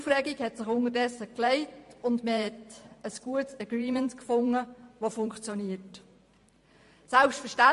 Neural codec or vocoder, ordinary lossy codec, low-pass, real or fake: vocoder, 44.1 kHz, 128 mel bands every 512 samples, BigVGAN v2; MP3, 48 kbps; 14.4 kHz; fake